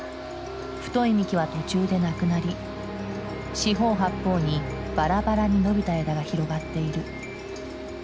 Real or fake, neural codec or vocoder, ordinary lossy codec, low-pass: real; none; none; none